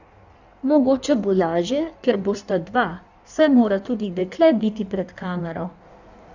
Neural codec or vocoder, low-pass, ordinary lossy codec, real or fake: codec, 16 kHz in and 24 kHz out, 1.1 kbps, FireRedTTS-2 codec; 7.2 kHz; none; fake